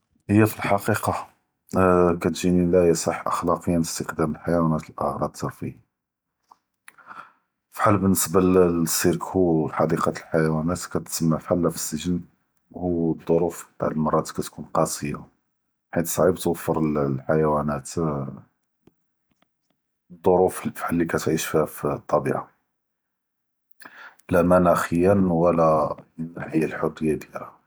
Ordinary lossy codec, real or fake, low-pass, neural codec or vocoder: none; real; none; none